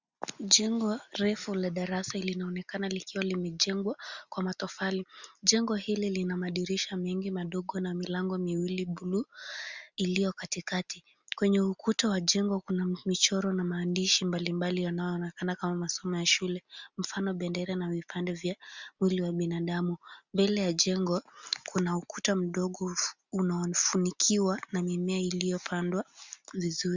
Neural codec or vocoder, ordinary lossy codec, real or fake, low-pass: none; Opus, 64 kbps; real; 7.2 kHz